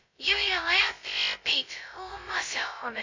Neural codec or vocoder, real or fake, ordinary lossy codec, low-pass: codec, 16 kHz, 0.2 kbps, FocalCodec; fake; MP3, 48 kbps; 7.2 kHz